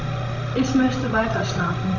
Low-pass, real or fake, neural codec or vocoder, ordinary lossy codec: 7.2 kHz; fake; codec, 16 kHz, 16 kbps, FreqCodec, larger model; Opus, 64 kbps